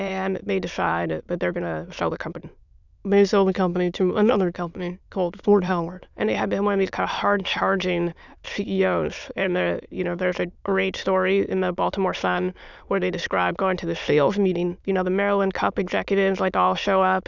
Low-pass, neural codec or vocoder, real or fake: 7.2 kHz; autoencoder, 22.05 kHz, a latent of 192 numbers a frame, VITS, trained on many speakers; fake